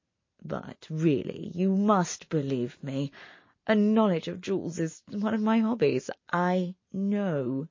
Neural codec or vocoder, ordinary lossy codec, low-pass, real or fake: none; MP3, 32 kbps; 7.2 kHz; real